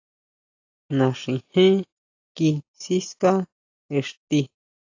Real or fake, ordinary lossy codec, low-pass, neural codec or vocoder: real; AAC, 48 kbps; 7.2 kHz; none